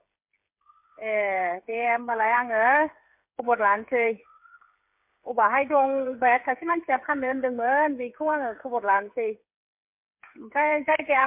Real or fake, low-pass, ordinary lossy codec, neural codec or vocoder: fake; 3.6 kHz; MP3, 32 kbps; codec, 16 kHz, 8 kbps, FreqCodec, smaller model